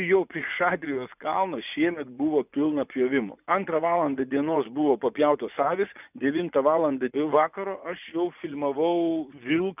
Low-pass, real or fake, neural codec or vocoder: 3.6 kHz; fake; vocoder, 24 kHz, 100 mel bands, Vocos